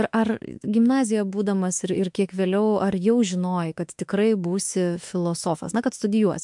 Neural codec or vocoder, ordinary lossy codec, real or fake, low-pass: autoencoder, 48 kHz, 32 numbers a frame, DAC-VAE, trained on Japanese speech; MP3, 64 kbps; fake; 10.8 kHz